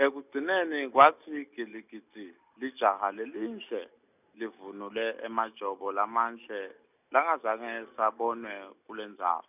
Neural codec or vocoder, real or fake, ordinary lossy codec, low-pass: none; real; none; 3.6 kHz